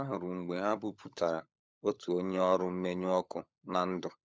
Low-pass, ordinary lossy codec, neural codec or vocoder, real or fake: none; none; codec, 16 kHz, 16 kbps, FunCodec, trained on LibriTTS, 50 frames a second; fake